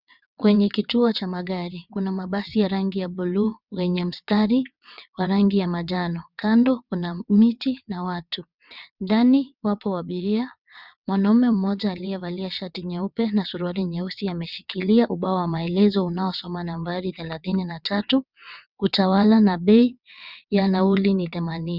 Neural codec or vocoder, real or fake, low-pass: vocoder, 22.05 kHz, 80 mel bands, WaveNeXt; fake; 5.4 kHz